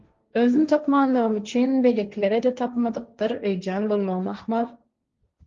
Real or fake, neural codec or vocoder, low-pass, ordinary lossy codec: fake; codec, 16 kHz, 1.1 kbps, Voila-Tokenizer; 7.2 kHz; Opus, 32 kbps